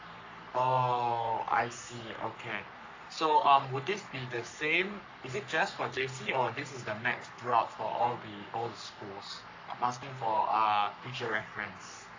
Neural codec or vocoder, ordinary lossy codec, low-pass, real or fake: codec, 44.1 kHz, 3.4 kbps, Pupu-Codec; AAC, 48 kbps; 7.2 kHz; fake